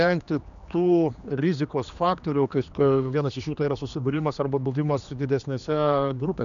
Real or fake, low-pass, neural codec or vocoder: fake; 7.2 kHz; codec, 16 kHz, 2 kbps, X-Codec, HuBERT features, trained on general audio